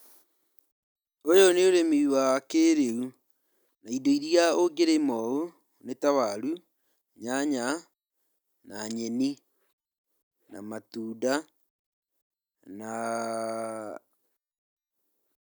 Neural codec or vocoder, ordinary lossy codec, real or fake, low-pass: none; none; real; 19.8 kHz